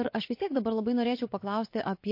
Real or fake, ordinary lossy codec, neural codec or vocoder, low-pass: fake; MP3, 32 kbps; vocoder, 24 kHz, 100 mel bands, Vocos; 5.4 kHz